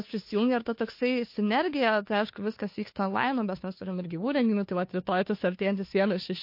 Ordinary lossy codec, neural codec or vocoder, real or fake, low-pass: MP3, 32 kbps; codec, 16 kHz, 2 kbps, FunCodec, trained on Chinese and English, 25 frames a second; fake; 5.4 kHz